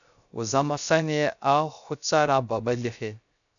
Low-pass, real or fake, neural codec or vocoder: 7.2 kHz; fake; codec, 16 kHz, 0.3 kbps, FocalCodec